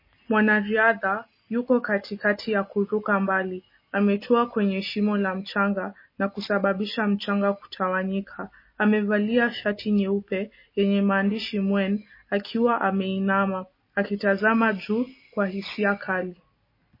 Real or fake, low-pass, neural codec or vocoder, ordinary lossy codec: real; 5.4 kHz; none; MP3, 24 kbps